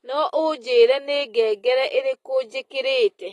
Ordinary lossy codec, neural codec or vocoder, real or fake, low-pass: AAC, 32 kbps; none; real; 19.8 kHz